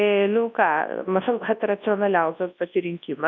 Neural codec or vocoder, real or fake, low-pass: codec, 24 kHz, 0.9 kbps, WavTokenizer, large speech release; fake; 7.2 kHz